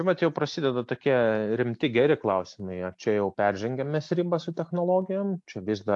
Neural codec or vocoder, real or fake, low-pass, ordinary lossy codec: none; real; 10.8 kHz; AAC, 64 kbps